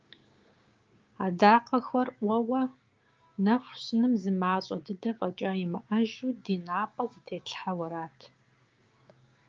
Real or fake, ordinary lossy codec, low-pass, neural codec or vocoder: fake; Opus, 32 kbps; 7.2 kHz; codec, 16 kHz, 6 kbps, DAC